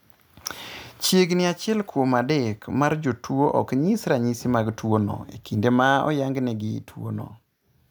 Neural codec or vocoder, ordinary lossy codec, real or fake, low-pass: none; none; real; none